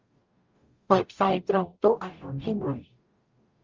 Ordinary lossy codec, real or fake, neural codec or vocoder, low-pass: Opus, 32 kbps; fake; codec, 44.1 kHz, 0.9 kbps, DAC; 7.2 kHz